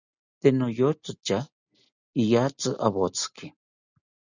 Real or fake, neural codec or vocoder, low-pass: real; none; 7.2 kHz